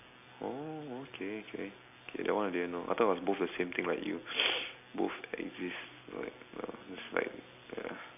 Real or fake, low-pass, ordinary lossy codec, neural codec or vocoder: real; 3.6 kHz; none; none